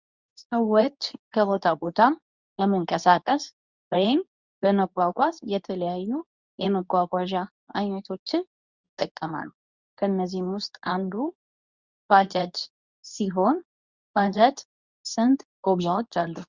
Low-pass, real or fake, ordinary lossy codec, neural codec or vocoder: 7.2 kHz; fake; Opus, 64 kbps; codec, 24 kHz, 0.9 kbps, WavTokenizer, medium speech release version 2